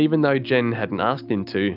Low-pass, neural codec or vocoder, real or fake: 5.4 kHz; none; real